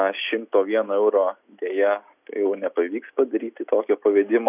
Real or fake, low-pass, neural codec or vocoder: real; 3.6 kHz; none